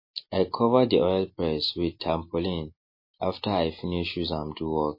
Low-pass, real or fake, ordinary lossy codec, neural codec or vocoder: 5.4 kHz; real; MP3, 24 kbps; none